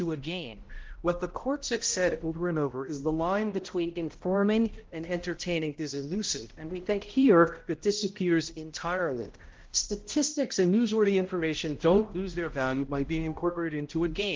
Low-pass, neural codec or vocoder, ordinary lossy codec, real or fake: 7.2 kHz; codec, 16 kHz, 0.5 kbps, X-Codec, HuBERT features, trained on balanced general audio; Opus, 16 kbps; fake